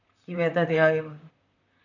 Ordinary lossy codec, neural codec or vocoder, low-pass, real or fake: none; vocoder, 44.1 kHz, 128 mel bands, Pupu-Vocoder; 7.2 kHz; fake